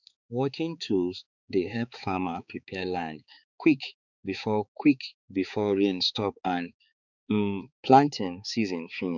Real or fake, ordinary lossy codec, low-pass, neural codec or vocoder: fake; none; 7.2 kHz; codec, 16 kHz, 4 kbps, X-Codec, HuBERT features, trained on balanced general audio